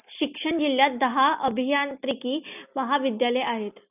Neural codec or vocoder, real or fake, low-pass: none; real; 3.6 kHz